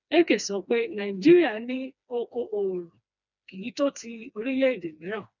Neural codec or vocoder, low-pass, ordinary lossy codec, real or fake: codec, 16 kHz, 2 kbps, FreqCodec, smaller model; 7.2 kHz; none; fake